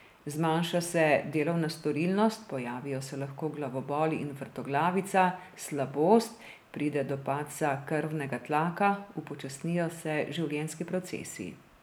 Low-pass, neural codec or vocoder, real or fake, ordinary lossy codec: none; none; real; none